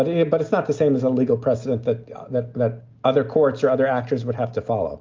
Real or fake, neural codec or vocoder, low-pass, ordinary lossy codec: real; none; 7.2 kHz; Opus, 32 kbps